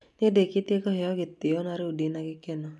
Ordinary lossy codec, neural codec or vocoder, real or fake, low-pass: none; none; real; none